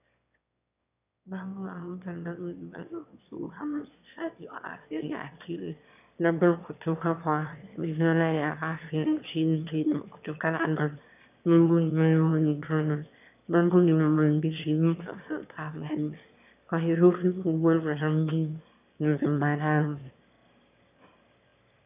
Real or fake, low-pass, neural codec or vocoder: fake; 3.6 kHz; autoencoder, 22.05 kHz, a latent of 192 numbers a frame, VITS, trained on one speaker